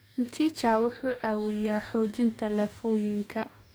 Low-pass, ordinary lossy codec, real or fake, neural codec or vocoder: none; none; fake; codec, 44.1 kHz, 2.6 kbps, DAC